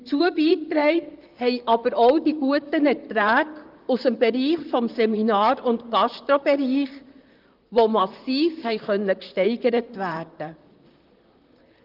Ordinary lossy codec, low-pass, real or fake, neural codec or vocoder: Opus, 24 kbps; 5.4 kHz; fake; vocoder, 44.1 kHz, 128 mel bands, Pupu-Vocoder